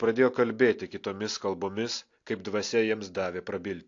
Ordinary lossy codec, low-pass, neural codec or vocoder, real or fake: AAC, 48 kbps; 7.2 kHz; none; real